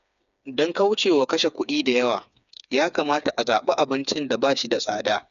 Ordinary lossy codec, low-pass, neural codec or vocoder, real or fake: none; 7.2 kHz; codec, 16 kHz, 4 kbps, FreqCodec, smaller model; fake